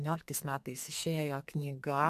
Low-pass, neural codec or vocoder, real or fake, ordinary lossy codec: 14.4 kHz; codec, 44.1 kHz, 2.6 kbps, SNAC; fake; AAC, 96 kbps